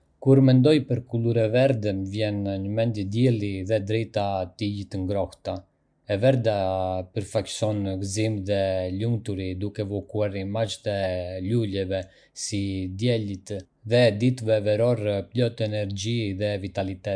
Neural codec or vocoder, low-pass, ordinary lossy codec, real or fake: none; 9.9 kHz; MP3, 96 kbps; real